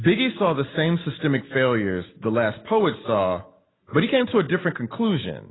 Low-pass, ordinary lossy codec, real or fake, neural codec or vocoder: 7.2 kHz; AAC, 16 kbps; real; none